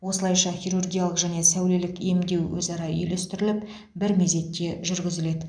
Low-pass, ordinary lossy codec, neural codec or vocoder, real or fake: 9.9 kHz; none; none; real